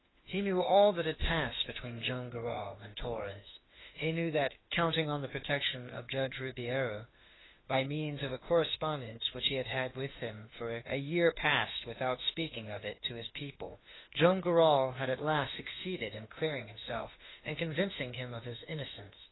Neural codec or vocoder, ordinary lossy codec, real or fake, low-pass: autoencoder, 48 kHz, 32 numbers a frame, DAC-VAE, trained on Japanese speech; AAC, 16 kbps; fake; 7.2 kHz